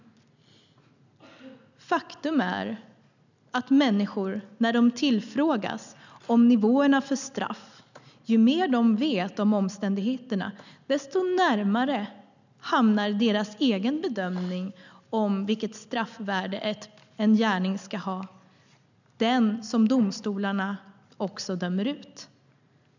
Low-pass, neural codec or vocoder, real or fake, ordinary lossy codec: 7.2 kHz; none; real; none